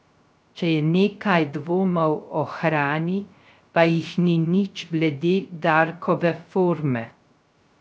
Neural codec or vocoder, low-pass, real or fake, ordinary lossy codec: codec, 16 kHz, 0.3 kbps, FocalCodec; none; fake; none